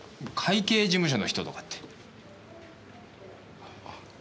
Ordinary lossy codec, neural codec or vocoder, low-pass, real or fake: none; none; none; real